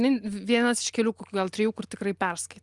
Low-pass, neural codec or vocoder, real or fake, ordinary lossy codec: 10.8 kHz; none; real; Opus, 32 kbps